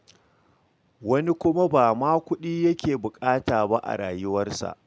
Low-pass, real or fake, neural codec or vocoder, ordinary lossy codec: none; real; none; none